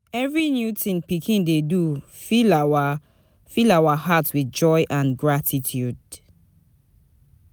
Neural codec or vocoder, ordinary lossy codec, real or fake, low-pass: none; none; real; none